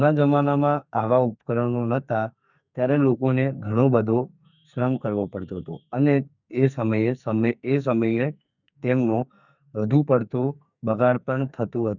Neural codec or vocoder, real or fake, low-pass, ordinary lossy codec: codec, 44.1 kHz, 2.6 kbps, SNAC; fake; 7.2 kHz; none